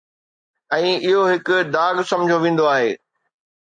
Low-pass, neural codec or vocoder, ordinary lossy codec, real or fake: 9.9 kHz; none; MP3, 48 kbps; real